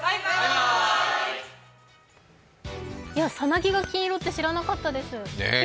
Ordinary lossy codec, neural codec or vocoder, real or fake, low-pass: none; none; real; none